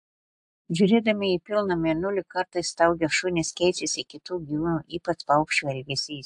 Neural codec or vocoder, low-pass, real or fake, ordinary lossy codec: none; 10.8 kHz; real; AAC, 64 kbps